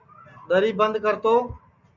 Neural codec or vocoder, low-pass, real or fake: none; 7.2 kHz; real